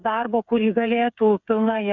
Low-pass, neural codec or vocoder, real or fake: 7.2 kHz; codec, 16 kHz, 8 kbps, FreqCodec, smaller model; fake